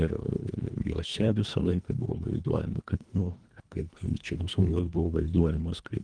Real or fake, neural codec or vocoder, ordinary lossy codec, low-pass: fake; codec, 24 kHz, 1.5 kbps, HILCodec; Opus, 24 kbps; 9.9 kHz